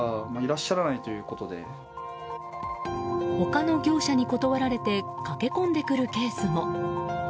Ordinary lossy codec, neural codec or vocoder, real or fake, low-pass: none; none; real; none